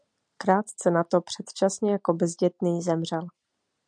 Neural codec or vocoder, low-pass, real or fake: none; 9.9 kHz; real